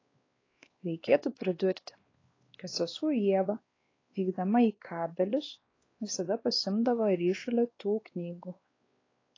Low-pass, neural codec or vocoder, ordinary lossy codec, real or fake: 7.2 kHz; codec, 16 kHz, 2 kbps, X-Codec, WavLM features, trained on Multilingual LibriSpeech; AAC, 32 kbps; fake